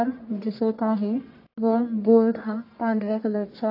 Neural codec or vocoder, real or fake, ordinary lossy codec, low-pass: codec, 44.1 kHz, 1.7 kbps, Pupu-Codec; fake; AAC, 32 kbps; 5.4 kHz